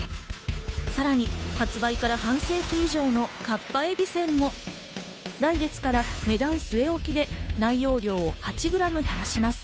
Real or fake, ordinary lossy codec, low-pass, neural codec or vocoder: fake; none; none; codec, 16 kHz, 2 kbps, FunCodec, trained on Chinese and English, 25 frames a second